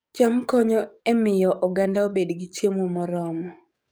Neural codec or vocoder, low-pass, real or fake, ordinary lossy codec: codec, 44.1 kHz, 7.8 kbps, DAC; none; fake; none